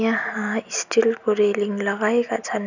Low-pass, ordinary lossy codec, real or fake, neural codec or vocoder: 7.2 kHz; none; real; none